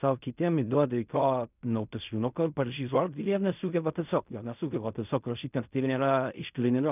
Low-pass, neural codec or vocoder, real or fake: 3.6 kHz; codec, 16 kHz in and 24 kHz out, 0.4 kbps, LongCat-Audio-Codec, fine tuned four codebook decoder; fake